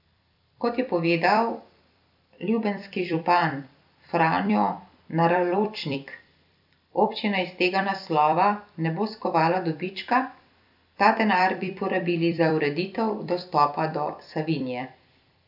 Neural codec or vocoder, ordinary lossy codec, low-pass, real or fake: vocoder, 44.1 kHz, 128 mel bands every 256 samples, BigVGAN v2; none; 5.4 kHz; fake